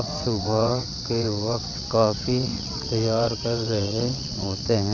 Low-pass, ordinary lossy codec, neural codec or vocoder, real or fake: 7.2 kHz; none; vocoder, 22.05 kHz, 80 mel bands, WaveNeXt; fake